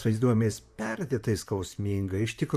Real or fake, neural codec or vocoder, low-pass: fake; vocoder, 44.1 kHz, 128 mel bands, Pupu-Vocoder; 14.4 kHz